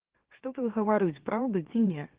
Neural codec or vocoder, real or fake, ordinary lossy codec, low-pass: autoencoder, 44.1 kHz, a latent of 192 numbers a frame, MeloTTS; fake; Opus, 16 kbps; 3.6 kHz